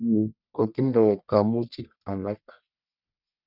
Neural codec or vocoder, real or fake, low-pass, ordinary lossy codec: codec, 44.1 kHz, 1.7 kbps, Pupu-Codec; fake; 5.4 kHz; none